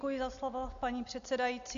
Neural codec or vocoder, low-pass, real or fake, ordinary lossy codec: none; 7.2 kHz; real; AAC, 96 kbps